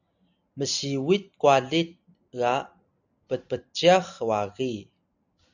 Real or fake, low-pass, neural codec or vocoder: real; 7.2 kHz; none